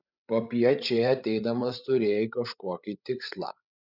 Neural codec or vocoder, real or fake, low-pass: codec, 16 kHz, 16 kbps, FreqCodec, larger model; fake; 5.4 kHz